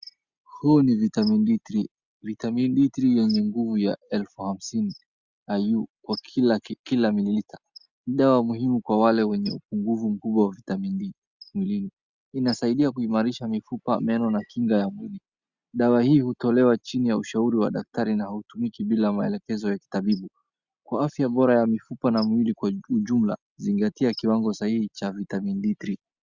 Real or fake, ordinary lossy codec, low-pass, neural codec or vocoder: real; Opus, 64 kbps; 7.2 kHz; none